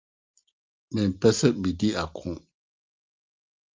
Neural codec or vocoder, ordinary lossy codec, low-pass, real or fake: none; Opus, 32 kbps; 7.2 kHz; real